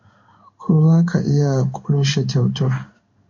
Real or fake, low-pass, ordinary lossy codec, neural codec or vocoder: fake; 7.2 kHz; MP3, 48 kbps; codec, 16 kHz in and 24 kHz out, 1 kbps, XY-Tokenizer